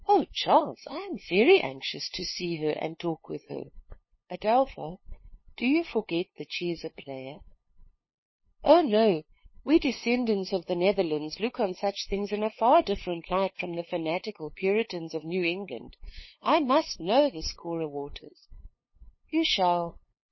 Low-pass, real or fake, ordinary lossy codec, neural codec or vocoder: 7.2 kHz; fake; MP3, 24 kbps; codec, 16 kHz, 2 kbps, FunCodec, trained on LibriTTS, 25 frames a second